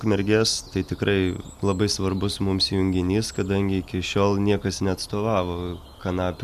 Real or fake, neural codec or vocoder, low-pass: real; none; 14.4 kHz